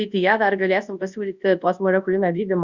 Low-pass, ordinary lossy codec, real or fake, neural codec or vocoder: 7.2 kHz; Opus, 64 kbps; fake; codec, 24 kHz, 0.9 kbps, WavTokenizer, large speech release